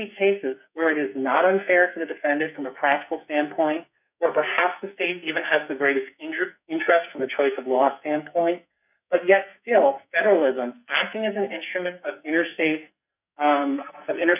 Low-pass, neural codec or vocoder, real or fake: 3.6 kHz; codec, 44.1 kHz, 2.6 kbps, SNAC; fake